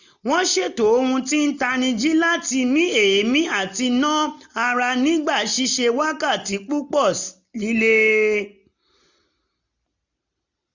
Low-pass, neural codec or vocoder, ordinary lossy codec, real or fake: 7.2 kHz; none; none; real